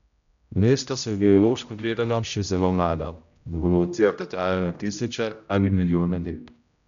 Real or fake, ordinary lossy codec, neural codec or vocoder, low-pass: fake; none; codec, 16 kHz, 0.5 kbps, X-Codec, HuBERT features, trained on general audio; 7.2 kHz